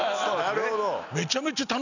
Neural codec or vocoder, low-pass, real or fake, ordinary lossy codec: none; 7.2 kHz; real; none